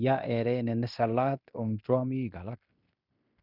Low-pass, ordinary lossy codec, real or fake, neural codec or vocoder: 5.4 kHz; none; fake; codec, 16 kHz in and 24 kHz out, 0.9 kbps, LongCat-Audio-Codec, fine tuned four codebook decoder